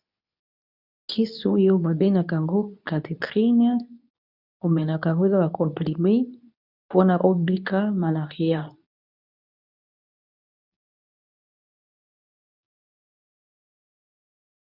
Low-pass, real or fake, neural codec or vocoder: 5.4 kHz; fake; codec, 24 kHz, 0.9 kbps, WavTokenizer, medium speech release version 2